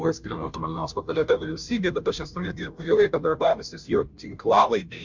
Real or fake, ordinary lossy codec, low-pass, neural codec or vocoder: fake; MP3, 64 kbps; 7.2 kHz; codec, 16 kHz, 0.5 kbps, FunCodec, trained on Chinese and English, 25 frames a second